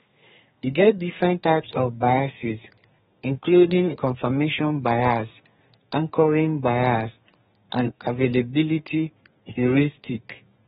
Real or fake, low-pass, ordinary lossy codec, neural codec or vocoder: fake; 14.4 kHz; AAC, 16 kbps; codec, 32 kHz, 1.9 kbps, SNAC